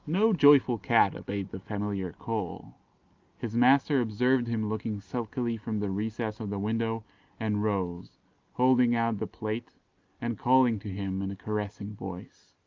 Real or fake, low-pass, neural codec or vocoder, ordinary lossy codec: real; 7.2 kHz; none; Opus, 24 kbps